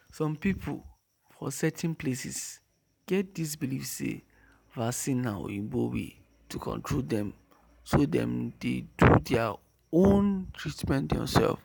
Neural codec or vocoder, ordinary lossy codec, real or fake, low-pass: none; none; real; none